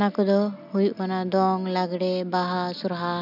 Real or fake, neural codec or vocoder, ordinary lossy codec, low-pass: real; none; none; 5.4 kHz